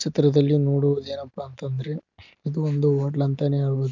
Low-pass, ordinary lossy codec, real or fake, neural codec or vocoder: 7.2 kHz; none; real; none